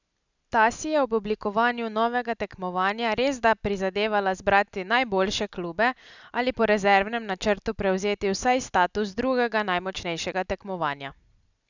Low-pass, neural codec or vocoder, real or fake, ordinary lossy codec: 7.2 kHz; none; real; none